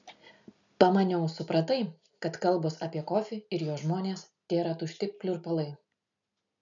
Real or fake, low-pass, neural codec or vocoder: real; 7.2 kHz; none